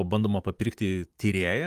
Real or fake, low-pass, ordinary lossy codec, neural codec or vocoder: fake; 14.4 kHz; Opus, 32 kbps; vocoder, 44.1 kHz, 128 mel bands, Pupu-Vocoder